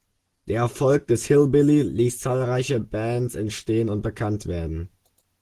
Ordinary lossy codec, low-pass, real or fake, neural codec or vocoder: Opus, 16 kbps; 14.4 kHz; real; none